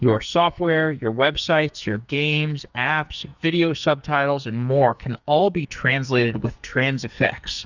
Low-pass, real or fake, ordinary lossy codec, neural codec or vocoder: 7.2 kHz; fake; Opus, 64 kbps; codec, 44.1 kHz, 2.6 kbps, SNAC